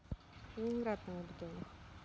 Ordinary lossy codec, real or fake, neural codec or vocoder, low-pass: none; real; none; none